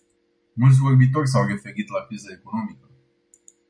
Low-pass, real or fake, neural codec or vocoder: 9.9 kHz; real; none